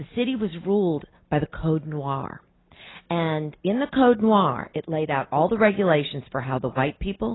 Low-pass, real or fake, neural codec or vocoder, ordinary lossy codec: 7.2 kHz; real; none; AAC, 16 kbps